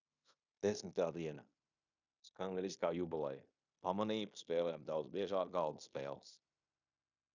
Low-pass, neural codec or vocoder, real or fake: 7.2 kHz; codec, 16 kHz in and 24 kHz out, 0.9 kbps, LongCat-Audio-Codec, fine tuned four codebook decoder; fake